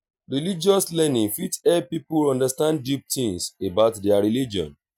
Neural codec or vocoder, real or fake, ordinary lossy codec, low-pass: none; real; none; none